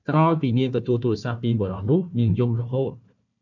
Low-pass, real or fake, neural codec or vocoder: 7.2 kHz; fake; codec, 16 kHz, 1 kbps, FunCodec, trained on Chinese and English, 50 frames a second